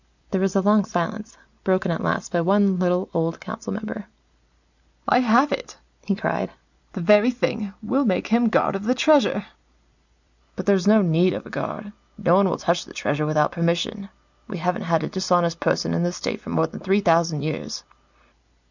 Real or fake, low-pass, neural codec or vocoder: real; 7.2 kHz; none